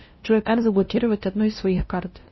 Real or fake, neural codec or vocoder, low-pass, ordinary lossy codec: fake; codec, 16 kHz, 0.5 kbps, FunCodec, trained on LibriTTS, 25 frames a second; 7.2 kHz; MP3, 24 kbps